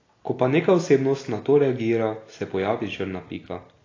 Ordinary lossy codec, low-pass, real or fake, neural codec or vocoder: AAC, 32 kbps; 7.2 kHz; real; none